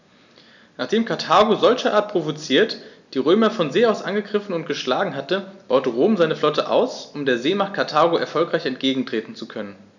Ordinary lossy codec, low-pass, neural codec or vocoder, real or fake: none; 7.2 kHz; none; real